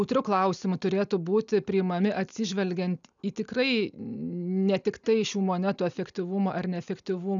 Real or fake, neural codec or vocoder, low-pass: real; none; 7.2 kHz